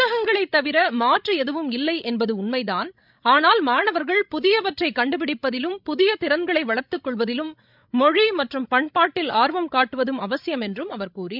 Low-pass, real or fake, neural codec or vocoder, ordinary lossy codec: 5.4 kHz; fake; codec, 16 kHz, 16 kbps, FreqCodec, larger model; none